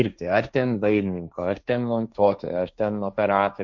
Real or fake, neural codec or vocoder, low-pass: fake; codec, 16 kHz, 1.1 kbps, Voila-Tokenizer; 7.2 kHz